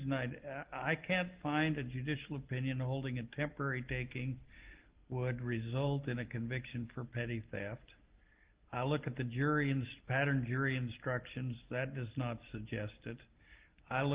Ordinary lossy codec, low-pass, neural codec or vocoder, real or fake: Opus, 16 kbps; 3.6 kHz; none; real